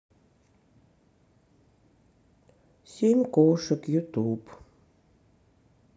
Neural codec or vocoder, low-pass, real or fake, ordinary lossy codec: none; none; real; none